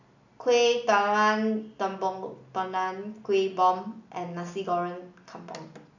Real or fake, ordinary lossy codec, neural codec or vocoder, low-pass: real; none; none; 7.2 kHz